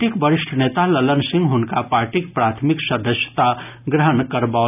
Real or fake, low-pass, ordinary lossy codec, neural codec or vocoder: real; 3.6 kHz; none; none